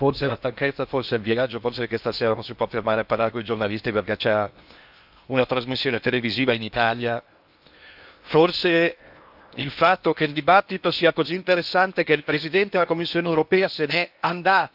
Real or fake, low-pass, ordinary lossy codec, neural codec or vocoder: fake; 5.4 kHz; AAC, 48 kbps; codec, 16 kHz in and 24 kHz out, 0.8 kbps, FocalCodec, streaming, 65536 codes